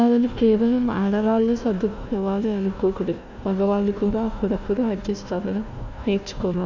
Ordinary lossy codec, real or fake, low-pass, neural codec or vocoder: none; fake; 7.2 kHz; codec, 16 kHz, 1 kbps, FunCodec, trained on Chinese and English, 50 frames a second